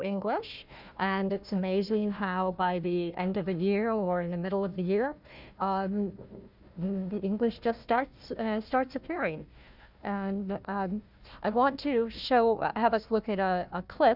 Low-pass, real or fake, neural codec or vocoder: 5.4 kHz; fake; codec, 16 kHz, 1 kbps, FunCodec, trained on Chinese and English, 50 frames a second